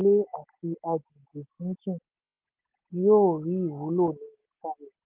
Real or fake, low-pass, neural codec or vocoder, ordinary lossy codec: real; 3.6 kHz; none; Opus, 32 kbps